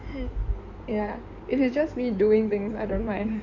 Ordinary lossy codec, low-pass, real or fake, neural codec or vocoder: none; 7.2 kHz; fake; codec, 16 kHz in and 24 kHz out, 2.2 kbps, FireRedTTS-2 codec